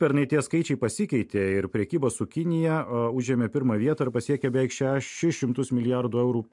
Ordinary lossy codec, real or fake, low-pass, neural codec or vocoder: MP3, 64 kbps; real; 10.8 kHz; none